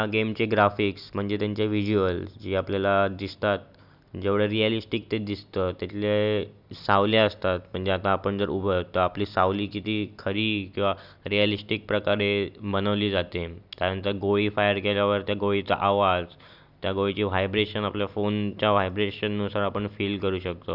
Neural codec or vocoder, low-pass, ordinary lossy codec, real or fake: none; 5.4 kHz; none; real